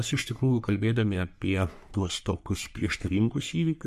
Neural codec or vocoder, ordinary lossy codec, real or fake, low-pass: codec, 44.1 kHz, 3.4 kbps, Pupu-Codec; MP3, 96 kbps; fake; 14.4 kHz